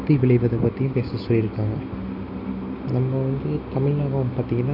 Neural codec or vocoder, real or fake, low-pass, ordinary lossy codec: none; real; 5.4 kHz; none